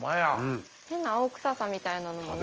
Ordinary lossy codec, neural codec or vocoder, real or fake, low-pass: Opus, 24 kbps; none; real; 7.2 kHz